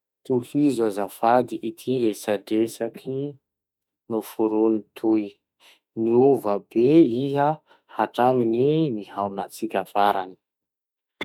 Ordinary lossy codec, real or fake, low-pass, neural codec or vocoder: none; fake; 19.8 kHz; autoencoder, 48 kHz, 32 numbers a frame, DAC-VAE, trained on Japanese speech